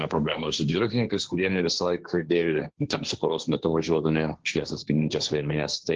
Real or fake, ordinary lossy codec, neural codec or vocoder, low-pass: fake; Opus, 16 kbps; codec, 16 kHz, 1.1 kbps, Voila-Tokenizer; 7.2 kHz